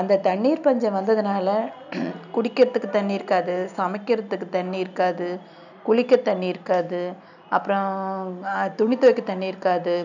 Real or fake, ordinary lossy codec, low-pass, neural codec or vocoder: real; none; 7.2 kHz; none